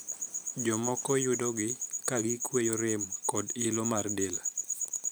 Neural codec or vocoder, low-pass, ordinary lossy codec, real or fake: vocoder, 44.1 kHz, 128 mel bands every 512 samples, BigVGAN v2; none; none; fake